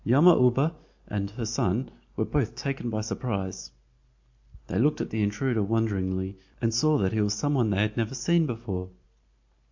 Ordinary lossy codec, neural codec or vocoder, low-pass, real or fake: MP3, 48 kbps; none; 7.2 kHz; real